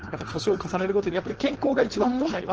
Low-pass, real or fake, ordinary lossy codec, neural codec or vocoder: 7.2 kHz; fake; Opus, 16 kbps; codec, 16 kHz, 4.8 kbps, FACodec